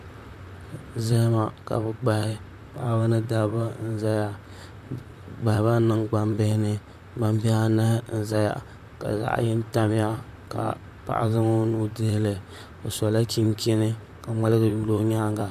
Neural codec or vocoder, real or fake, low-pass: vocoder, 44.1 kHz, 128 mel bands, Pupu-Vocoder; fake; 14.4 kHz